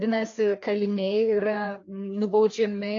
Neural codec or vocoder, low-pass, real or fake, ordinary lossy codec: codec, 16 kHz, 2 kbps, FreqCodec, larger model; 7.2 kHz; fake; AAC, 48 kbps